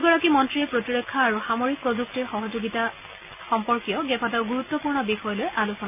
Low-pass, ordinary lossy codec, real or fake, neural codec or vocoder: 3.6 kHz; none; real; none